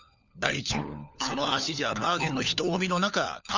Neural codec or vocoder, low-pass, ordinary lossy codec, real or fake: codec, 16 kHz, 4 kbps, FunCodec, trained on LibriTTS, 50 frames a second; 7.2 kHz; none; fake